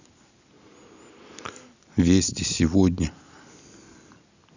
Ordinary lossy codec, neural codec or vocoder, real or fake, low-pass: none; none; real; 7.2 kHz